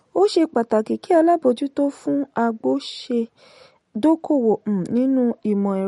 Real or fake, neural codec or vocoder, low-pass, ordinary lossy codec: real; none; 19.8 kHz; MP3, 48 kbps